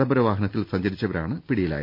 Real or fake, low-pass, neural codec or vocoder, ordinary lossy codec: real; 5.4 kHz; none; none